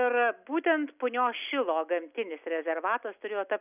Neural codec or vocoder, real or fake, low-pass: none; real; 3.6 kHz